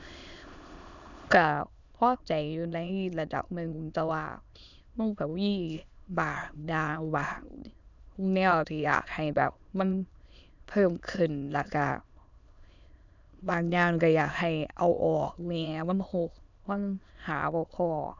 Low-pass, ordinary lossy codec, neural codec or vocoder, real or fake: 7.2 kHz; none; autoencoder, 22.05 kHz, a latent of 192 numbers a frame, VITS, trained on many speakers; fake